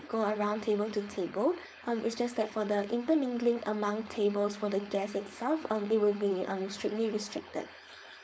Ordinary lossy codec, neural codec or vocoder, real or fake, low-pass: none; codec, 16 kHz, 4.8 kbps, FACodec; fake; none